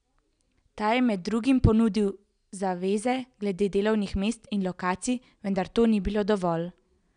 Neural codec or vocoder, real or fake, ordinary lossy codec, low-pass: none; real; none; 9.9 kHz